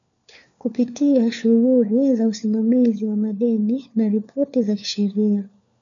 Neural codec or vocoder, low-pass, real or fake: codec, 16 kHz, 4 kbps, FunCodec, trained on LibriTTS, 50 frames a second; 7.2 kHz; fake